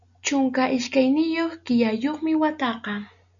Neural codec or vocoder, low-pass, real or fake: none; 7.2 kHz; real